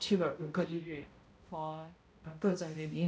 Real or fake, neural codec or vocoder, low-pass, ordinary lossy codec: fake; codec, 16 kHz, 0.5 kbps, X-Codec, HuBERT features, trained on balanced general audio; none; none